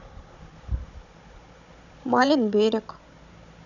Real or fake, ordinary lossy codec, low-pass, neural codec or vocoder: fake; none; 7.2 kHz; codec, 16 kHz, 16 kbps, FunCodec, trained on Chinese and English, 50 frames a second